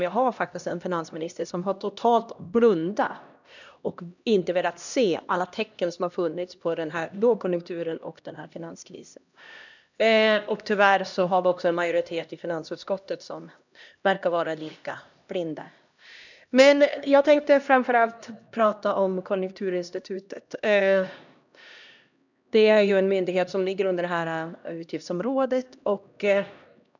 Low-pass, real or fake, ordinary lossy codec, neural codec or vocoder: 7.2 kHz; fake; none; codec, 16 kHz, 1 kbps, X-Codec, HuBERT features, trained on LibriSpeech